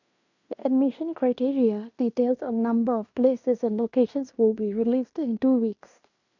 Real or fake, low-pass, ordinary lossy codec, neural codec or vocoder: fake; 7.2 kHz; none; codec, 16 kHz in and 24 kHz out, 0.9 kbps, LongCat-Audio-Codec, fine tuned four codebook decoder